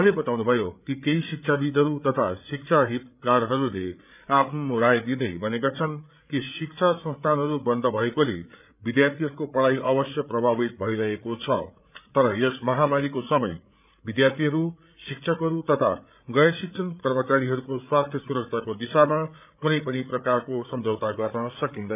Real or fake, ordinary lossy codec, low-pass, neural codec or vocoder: fake; none; 3.6 kHz; codec, 16 kHz, 8 kbps, FreqCodec, larger model